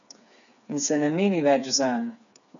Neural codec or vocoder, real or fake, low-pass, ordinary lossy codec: codec, 16 kHz, 4 kbps, FreqCodec, smaller model; fake; 7.2 kHz; none